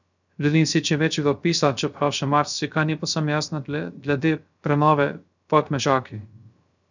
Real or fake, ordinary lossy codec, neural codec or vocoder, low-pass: fake; none; codec, 16 kHz, 0.3 kbps, FocalCodec; 7.2 kHz